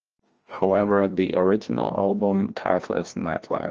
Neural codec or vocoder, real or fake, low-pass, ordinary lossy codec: codec, 16 kHz, 1 kbps, FreqCodec, larger model; fake; 7.2 kHz; Opus, 24 kbps